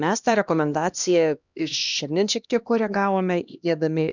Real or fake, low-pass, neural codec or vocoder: fake; 7.2 kHz; codec, 16 kHz, 1 kbps, X-Codec, HuBERT features, trained on LibriSpeech